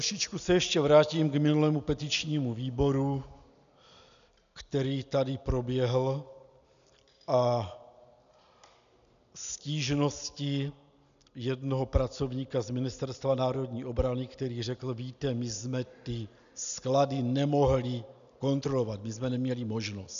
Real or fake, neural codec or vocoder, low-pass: real; none; 7.2 kHz